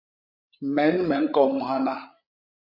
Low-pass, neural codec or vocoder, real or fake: 5.4 kHz; codec, 16 kHz, 16 kbps, FreqCodec, larger model; fake